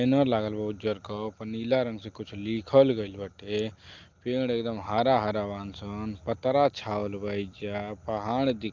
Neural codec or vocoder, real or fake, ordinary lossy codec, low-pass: none; real; Opus, 24 kbps; 7.2 kHz